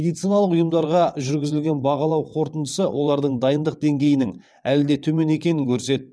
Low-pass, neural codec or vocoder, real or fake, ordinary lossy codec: none; vocoder, 22.05 kHz, 80 mel bands, WaveNeXt; fake; none